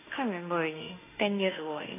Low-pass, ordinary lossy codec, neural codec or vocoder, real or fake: 3.6 kHz; AAC, 16 kbps; autoencoder, 48 kHz, 32 numbers a frame, DAC-VAE, trained on Japanese speech; fake